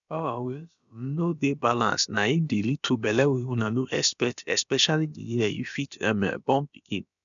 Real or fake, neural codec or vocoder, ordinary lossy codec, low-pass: fake; codec, 16 kHz, about 1 kbps, DyCAST, with the encoder's durations; none; 7.2 kHz